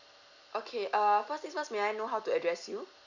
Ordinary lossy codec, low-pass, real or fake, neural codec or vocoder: none; 7.2 kHz; real; none